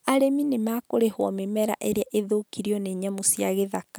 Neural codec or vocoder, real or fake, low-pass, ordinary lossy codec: none; real; none; none